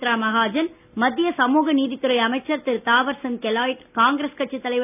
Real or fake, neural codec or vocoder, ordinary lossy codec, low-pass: real; none; none; 3.6 kHz